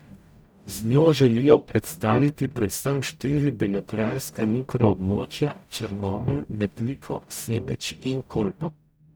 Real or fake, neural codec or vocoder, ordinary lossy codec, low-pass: fake; codec, 44.1 kHz, 0.9 kbps, DAC; none; none